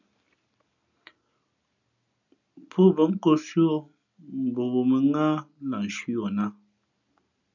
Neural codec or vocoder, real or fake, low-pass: none; real; 7.2 kHz